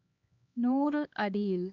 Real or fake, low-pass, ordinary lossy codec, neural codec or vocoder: fake; 7.2 kHz; none; codec, 16 kHz, 2 kbps, X-Codec, HuBERT features, trained on LibriSpeech